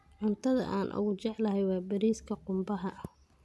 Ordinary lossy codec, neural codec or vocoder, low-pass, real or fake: none; none; none; real